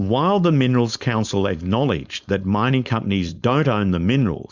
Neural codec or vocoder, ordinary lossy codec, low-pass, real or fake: codec, 16 kHz, 4.8 kbps, FACodec; Opus, 64 kbps; 7.2 kHz; fake